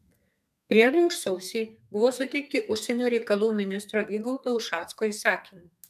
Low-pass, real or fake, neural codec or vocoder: 14.4 kHz; fake; codec, 32 kHz, 1.9 kbps, SNAC